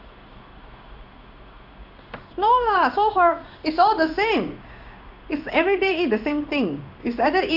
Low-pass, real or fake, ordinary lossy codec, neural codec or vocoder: 5.4 kHz; fake; none; codec, 16 kHz in and 24 kHz out, 1 kbps, XY-Tokenizer